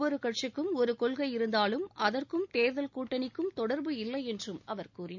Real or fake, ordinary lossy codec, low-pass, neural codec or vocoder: real; none; 7.2 kHz; none